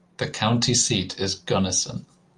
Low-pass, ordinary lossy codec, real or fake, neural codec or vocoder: 10.8 kHz; Opus, 32 kbps; real; none